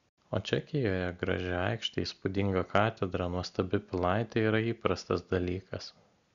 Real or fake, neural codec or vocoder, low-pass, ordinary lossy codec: real; none; 7.2 kHz; MP3, 96 kbps